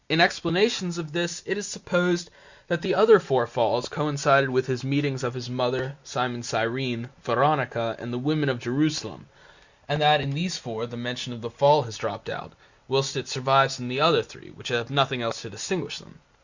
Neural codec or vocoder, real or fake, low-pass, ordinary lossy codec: none; real; 7.2 kHz; Opus, 64 kbps